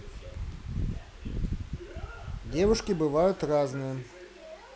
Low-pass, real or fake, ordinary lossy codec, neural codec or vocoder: none; real; none; none